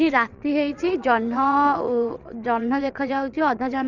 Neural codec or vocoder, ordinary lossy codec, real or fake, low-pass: vocoder, 22.05 kHz, 80 mel bands, WaveNeXt; none; fake; 7.2 kHz